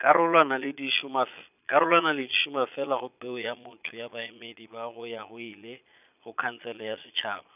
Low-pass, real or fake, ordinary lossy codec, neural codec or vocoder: 3.6 kHz; fake; none; vocoder, 22.05 kHz, 80 mel bands, Vocos